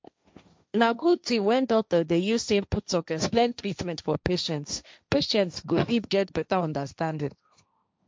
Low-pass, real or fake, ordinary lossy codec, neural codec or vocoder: none; fake; none; codec, 16 kHz, 1.1 kbps, Voila-Tokenizer